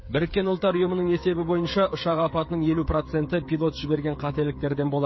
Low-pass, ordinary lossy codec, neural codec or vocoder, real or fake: 7.2 kHz; MP3, 24 kbps; codec, 16 kHz, 8 kbps, FreqCodec, smaller model; fake